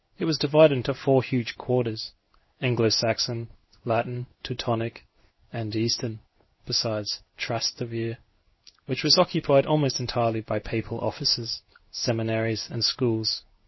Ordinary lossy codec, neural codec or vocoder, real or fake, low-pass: MP3, 24 kbps; codec, 16 kHz in and 24 kHz out, 1 kbps, XY-Tokenizer; fake; 7.2 kHz